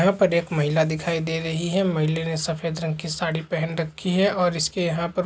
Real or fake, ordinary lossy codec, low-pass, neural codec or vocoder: real; none; none; none